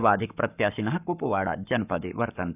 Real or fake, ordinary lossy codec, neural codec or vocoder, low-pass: fake; none; codec, 44.1 kHz, 7.8 kbps, Pupu-Codec; 3.6 kHz